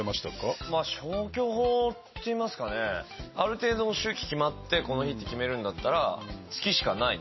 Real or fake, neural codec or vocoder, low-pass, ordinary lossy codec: real; none; 7.2 kHz; MP3, 24 kbps